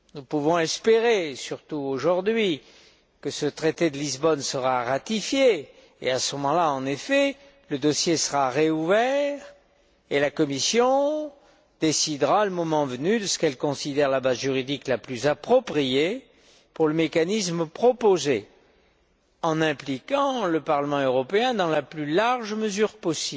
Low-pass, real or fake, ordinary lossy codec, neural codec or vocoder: none; real; none; none